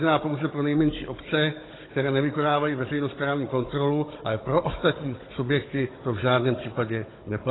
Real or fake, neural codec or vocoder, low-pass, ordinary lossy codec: fake; codec, 16 kHz, 16 kbps, FunCodec, trained on Chinese and English, 50 frames a second; 7.2 kHz; AAC, 16 kbps